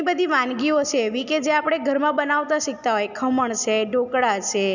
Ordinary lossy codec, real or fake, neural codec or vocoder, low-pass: none; real; none; 7.2 kHz